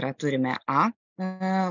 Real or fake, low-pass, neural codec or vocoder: real; 7.2 kHz; none